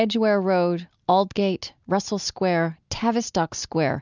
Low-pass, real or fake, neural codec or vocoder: 7.2 kHz; real; none